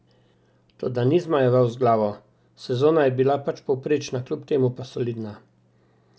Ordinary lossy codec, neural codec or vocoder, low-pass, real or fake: none; none; none; real